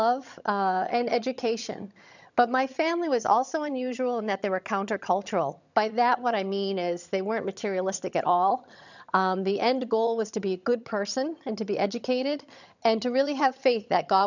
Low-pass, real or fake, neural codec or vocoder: 7.2 kHz; fake; vocoder, 22.05 kHz, 80 mel bands, HiFi-GAN